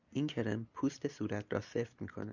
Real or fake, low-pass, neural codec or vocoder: real; 7.2 kHz; none